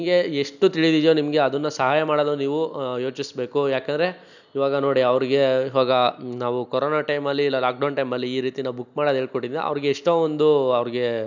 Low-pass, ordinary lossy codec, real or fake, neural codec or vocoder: 7.2 kHz; none; real; none